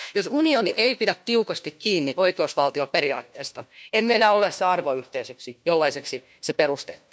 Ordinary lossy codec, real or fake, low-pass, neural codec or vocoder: none; fake; none; codec, 16 kHz, 1 kbps, FunCodec, trained on LibriTTS, 50 frames a second